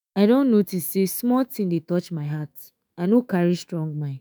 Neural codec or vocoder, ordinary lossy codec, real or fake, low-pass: autoencoder, 48 kHz, 128 numbers a frame, DAC-VAE, trained on Japanese speech; none; fake; none